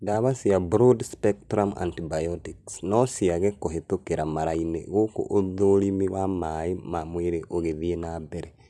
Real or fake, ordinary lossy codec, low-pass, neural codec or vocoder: real; none; none; none